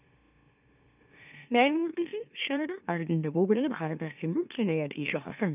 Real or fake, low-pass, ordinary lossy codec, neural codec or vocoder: fake; 3.6 kHz; none; autoencoder, 44.1 kHz, a latent of 192 numbers a frame, MeloTTS